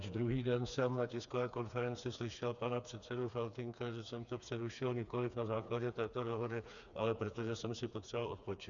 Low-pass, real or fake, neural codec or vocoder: 7.2 kHz; fake; codec, 16 kHz, 4 kbps, FreqCodec, smaller model